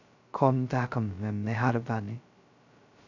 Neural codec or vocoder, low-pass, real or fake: codec, 16 kHz, 0.2 kbps, FocalCodec; 7.2 kHz; fake